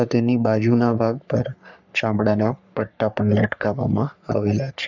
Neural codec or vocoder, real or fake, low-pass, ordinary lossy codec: codec, 44.1 kHz, 3.4 kbps, Pupu-Codec; fake; 7.2 kHz; none